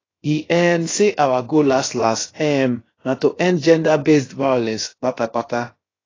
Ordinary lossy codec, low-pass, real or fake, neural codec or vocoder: AAC, 32 kbps; 7.2 kHz; fake; codec, 16 kHz, about 1 kbps, DyCAST, with the encoder's durations